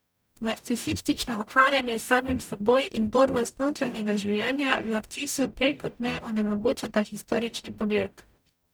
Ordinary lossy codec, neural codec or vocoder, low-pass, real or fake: none; codec, 44.1 kHz, 0.9 kbps, DAC; none; fake